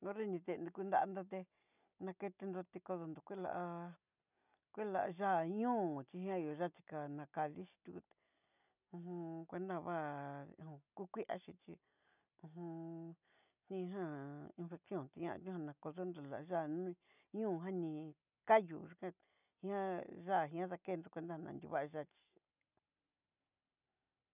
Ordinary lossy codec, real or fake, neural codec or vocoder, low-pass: none; real; none; 3.6 kHz